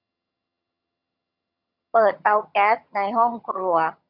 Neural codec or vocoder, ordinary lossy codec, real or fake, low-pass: vocoder, 22.05 kHz, 80 mel bands, HiFi-GAN; none; fake; 5.4 kHz